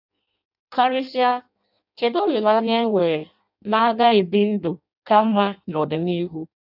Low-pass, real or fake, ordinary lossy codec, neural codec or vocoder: 5.4 kHz; fake; none; codec, 16 kHz in and 24 kHz out, 0.6 kbps, FireRedTTS-2 codec